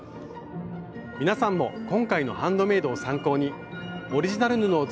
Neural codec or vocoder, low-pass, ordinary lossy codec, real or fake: none; none; none; real